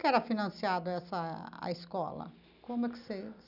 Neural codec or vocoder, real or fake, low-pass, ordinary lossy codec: none; real; 5.4 kHz; none